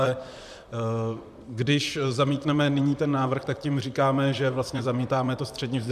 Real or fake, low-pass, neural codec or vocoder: fake; 14.4 kHz; vocoder, 44.1 kHz, 128 mel bands, Pupu-Vocoder